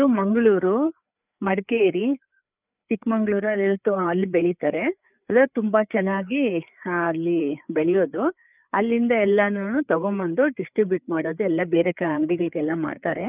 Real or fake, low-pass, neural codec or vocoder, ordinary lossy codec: fake; 3.6 kHz; codec, 16 kHz, 4 kbps, FreqCodec, larger model; none